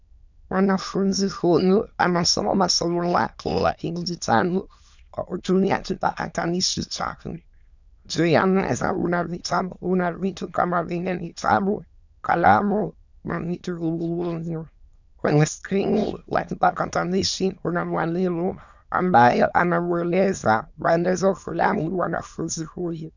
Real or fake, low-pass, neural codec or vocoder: fake; 7.2 kHz; autoencoder, 22.05 kHz, a latent of 192 numbers a frame, VITS, trained on many speakers